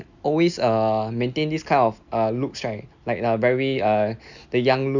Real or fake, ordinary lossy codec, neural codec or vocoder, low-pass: real; none; none; 7.2 kHz